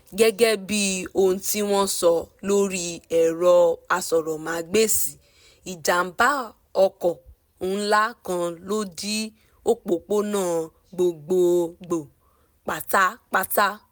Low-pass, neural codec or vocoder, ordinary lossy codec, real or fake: none; none; none; real